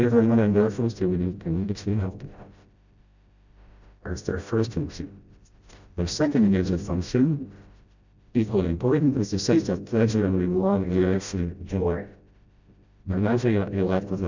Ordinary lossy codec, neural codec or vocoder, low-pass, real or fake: Opus, 64 kbps; codec, 16 kHz, 0.5 kbps, FreqCodec, smaller model; 7.2 kHz; fake